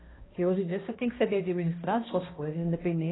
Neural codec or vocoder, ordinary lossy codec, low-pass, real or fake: codec, 16 kHz, 1 kbps, X-Codec, HuBERT features, trained on balanced general audio; AAC, 16 kbps; 7.2 kHz; fake